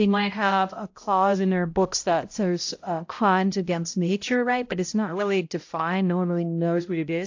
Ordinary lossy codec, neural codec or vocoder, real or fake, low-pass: AAC, 48 kbps; codec, 16 kHz, 0.5 kbps, X-Codec, HuBERT features, trained on balanced general audio; fake; 7.2 kHz